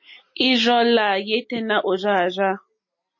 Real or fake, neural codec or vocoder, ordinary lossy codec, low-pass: real; none; MP3, 32 kbps; 7.2 kHz